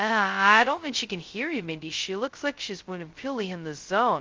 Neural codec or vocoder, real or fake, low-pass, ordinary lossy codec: codec, 16 kHz, 0.2 kbps, FocalCodec; fake; 7.2 kHz; Opus, 32 kbps